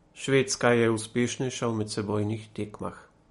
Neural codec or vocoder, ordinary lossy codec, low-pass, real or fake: none; MP3, 48 kbps; 14.4 kHz; real